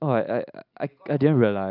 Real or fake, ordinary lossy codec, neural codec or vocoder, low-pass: real; AAC, 48 kbps; none; 5.4 kHz